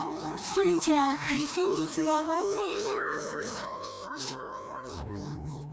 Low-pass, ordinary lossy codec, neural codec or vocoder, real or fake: none; none; codec, 16 kHz, 1 kbps, FreqCodec, larger model; fake